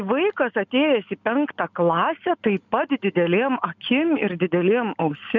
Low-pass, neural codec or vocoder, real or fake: 7.2 kHz; none; real